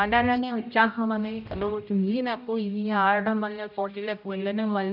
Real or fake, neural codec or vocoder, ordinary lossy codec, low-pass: fake; codec, 16 kHz, 0.5 kbps, X-Codec, HuBERT features, trained on general audio; none; 5.4 kHz